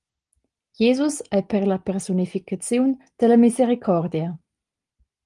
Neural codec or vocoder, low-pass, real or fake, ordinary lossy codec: none; 10.8 kHz; real; Opus, 24 kbps